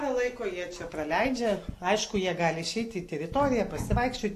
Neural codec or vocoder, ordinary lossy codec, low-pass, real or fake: none; AAC, 64 kbps; 14.4 kHz; real